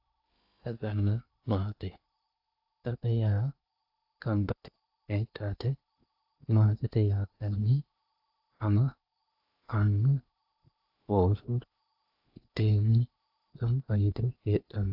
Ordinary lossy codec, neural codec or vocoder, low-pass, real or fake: AAC, 48 kbps; codec, 16 kHz in and 24 kHz out, 0.8 kbps, FocalCodec, streaming, 65536 codes; 5.4 kHz; fake